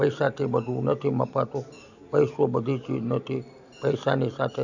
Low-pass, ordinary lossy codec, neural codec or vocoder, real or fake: 7.2 kHz; none; none; real